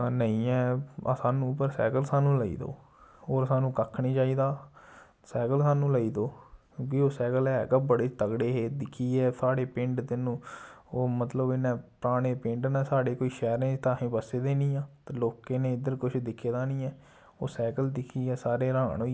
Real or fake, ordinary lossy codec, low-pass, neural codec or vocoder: real; none; none; none